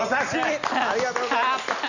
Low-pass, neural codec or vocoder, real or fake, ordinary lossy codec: 7.2 kHz; none; real; none